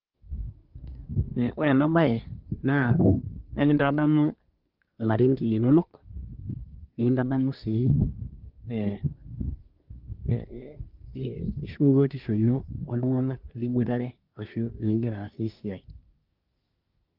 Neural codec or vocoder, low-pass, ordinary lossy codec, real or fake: codec, 24 kHz, 1 kbps, SNAC; 5.4 kHz; Opus, 24 kbps; fake